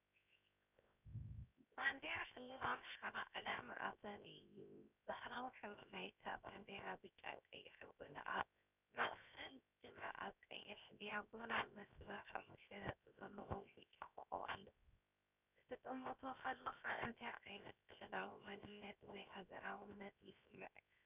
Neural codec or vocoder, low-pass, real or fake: codec, 16 kHz, 0.7 kbps, FocalCodec; 3.6 kHz; fake